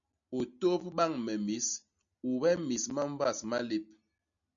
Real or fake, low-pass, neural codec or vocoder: real; 7.2 kHz; none